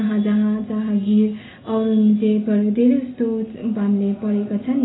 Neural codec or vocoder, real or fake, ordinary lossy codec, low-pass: none; real; AAC, 16 kbps; 7.2 kHz